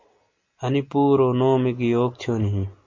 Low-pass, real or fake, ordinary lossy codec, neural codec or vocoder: 7.2 kHz; real; MP3, 48 kbps; none